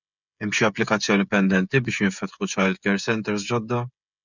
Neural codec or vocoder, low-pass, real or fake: codec, 16 kHz, 16 kbps, FreqCodec, smaller model; 7.2 kHz; fake